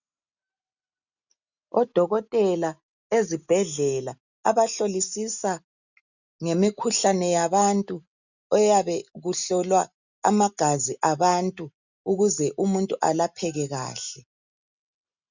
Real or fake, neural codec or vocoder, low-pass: real; none; 7.2 kHz